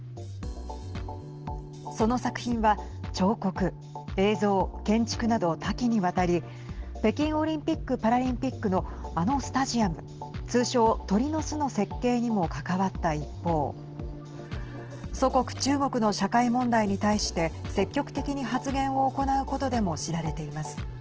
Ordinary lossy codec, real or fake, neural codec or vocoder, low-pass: Opus, 16 kbps; real; none; 7.2 kHz